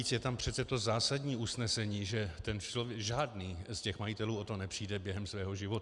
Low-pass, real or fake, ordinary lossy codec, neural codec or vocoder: 10.8 kHz; real; Opus, 64 kbps; none